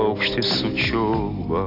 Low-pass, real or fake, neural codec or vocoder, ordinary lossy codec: 5.4 kHz; real; none; AAC, 32 kbps